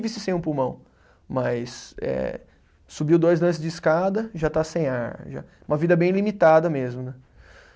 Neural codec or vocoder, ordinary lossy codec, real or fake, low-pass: none; none; real; none